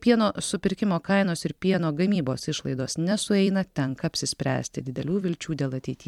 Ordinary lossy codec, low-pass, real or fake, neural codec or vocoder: MP3, 96 kbps; 19.8 kHz; fake; vocoder, 44.1 kHz, 128 mel bands every 256 samples, BigVGAN v2